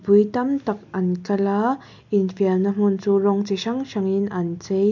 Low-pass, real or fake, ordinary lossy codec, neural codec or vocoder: 7.2 kHz; real; none; none